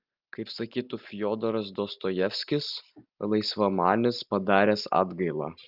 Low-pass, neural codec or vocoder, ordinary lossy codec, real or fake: 5.4 kHz; none; Opus, 24 kbps; real